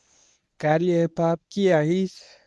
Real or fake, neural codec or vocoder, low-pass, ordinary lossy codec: fake; codec, 24 kHz, 0.9 kbps, WavTokenizer, medium speech release version 1; none; none